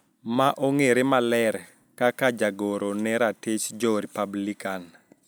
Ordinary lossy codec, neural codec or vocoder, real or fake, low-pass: none; none; real; none